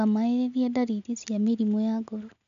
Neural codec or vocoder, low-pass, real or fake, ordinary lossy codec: none; 7.2 kHz; real; none